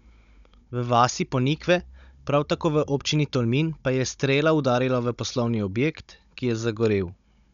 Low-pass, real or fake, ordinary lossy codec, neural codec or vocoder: 7.2 kHz; fake; none; codec, 16 kHz, 16 kbps, FunCodec, trained on Chinese and English, 50 frames a second